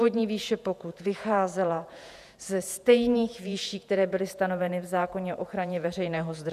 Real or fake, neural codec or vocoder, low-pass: fake; vocoder, 48 kHz, 128 mel bands, Vocos; 14.4 kHz